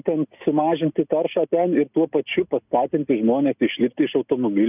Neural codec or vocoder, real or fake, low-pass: none; real; 3.6 kHz